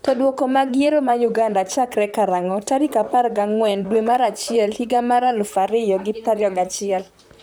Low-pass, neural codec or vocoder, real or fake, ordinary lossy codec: none; vocoder, 44.1 kHz, 128 mel bands, Pupu-Vocoder; fake; none